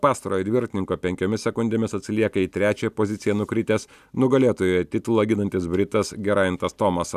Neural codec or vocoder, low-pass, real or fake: none; 14.4 kHz; real